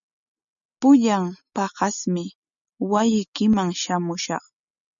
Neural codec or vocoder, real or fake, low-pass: none; real; 7.2 kHz